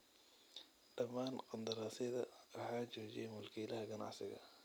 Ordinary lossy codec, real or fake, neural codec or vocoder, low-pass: none; real; none; none